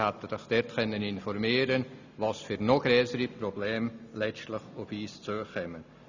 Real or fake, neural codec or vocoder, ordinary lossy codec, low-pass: real; none; none; 7.2 kHz